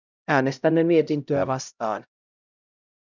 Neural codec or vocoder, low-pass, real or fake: codec, 16 kHz, 0.5 kbps, X-Codec, HuBERT features, trained on LibriSpeech; 7.2 kHz; fake